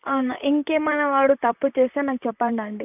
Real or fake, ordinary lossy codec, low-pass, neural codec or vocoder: fake; none; 3.6 kHz; vocoder, 44.1 kHz, 128 mel bands, Pupu-Vocoder